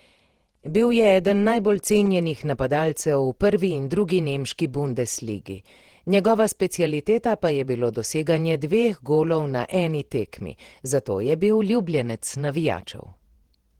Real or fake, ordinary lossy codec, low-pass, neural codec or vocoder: fake; Opus, 16 kbps; 19.8 kHz; vocoder, 48 kHz, 128 mel bands, Vocos